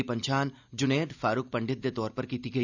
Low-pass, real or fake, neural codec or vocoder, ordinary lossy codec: 7.2 kHz; real; none; none